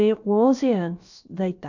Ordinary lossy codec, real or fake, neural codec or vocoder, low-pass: none; fake; codec, 16 kHz, about 1 kbps, DyCAST, with the encoder's durations; 7.2 kHz